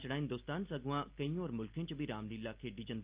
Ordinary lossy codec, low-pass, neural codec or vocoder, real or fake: Opus, 24 kbps; 3.6 kHz; none; real